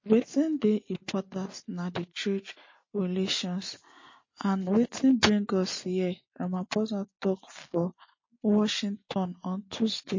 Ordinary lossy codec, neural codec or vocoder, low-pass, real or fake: MP3, 32 kbps; none; 7.2 kHz; real